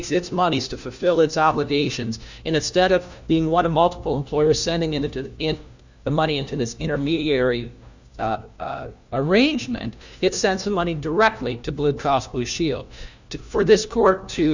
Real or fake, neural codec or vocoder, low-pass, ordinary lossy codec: fake; codec, 16 kHz, 1 kbps, FunCodec, trained on LibriTTS, 50 frames a second; 7.2 kHz; Opus, 64 kbps